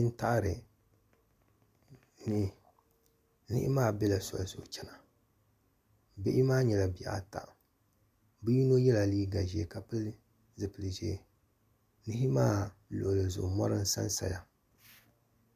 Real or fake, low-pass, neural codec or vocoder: real; 14.4 kHz; none